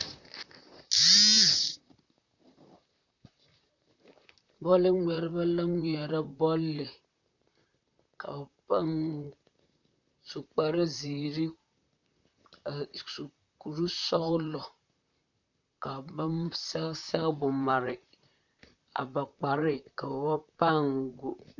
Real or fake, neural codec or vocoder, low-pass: fake; vocoder, 44.1 kHz, 128 mel bands, Pupu-Vocoder; 7.2 kHz